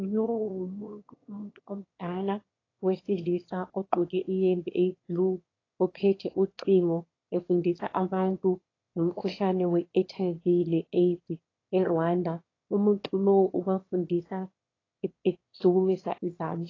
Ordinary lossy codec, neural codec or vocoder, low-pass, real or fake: AAC, 32 kbps; autoencoder, 22.05 kHz, a latent of 192 numbers a frame, VITS, trained on one speaker; 7.2 kHz; fake